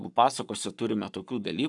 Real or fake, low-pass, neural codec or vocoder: fake; 10.8 kHz; codec, 44.1 kHz, 7.8 kbps, Pupu-Codec